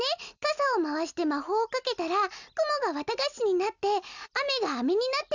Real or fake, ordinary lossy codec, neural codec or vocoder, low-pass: real; none; none; 7.2 kHz